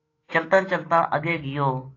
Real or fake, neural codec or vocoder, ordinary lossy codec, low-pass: fake; autoencoder, 48 kHz, 128 numbers a frame, DAC-VAE, trained on Japanese speech; AAC, 32 kbps; 7.2 kHz